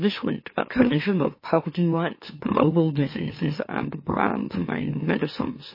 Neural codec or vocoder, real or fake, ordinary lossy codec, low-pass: autoencoder, 44.1 kHz, a latent of 192 numbers a frame, MeloTTS; fake; MP3, 24 kbps; 5.4 kHz